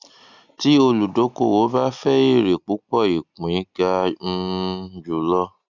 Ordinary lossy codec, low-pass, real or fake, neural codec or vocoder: none; 7.2 kHz; real; none